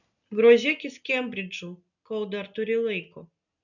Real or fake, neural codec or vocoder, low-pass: real; none; 7.2 kHz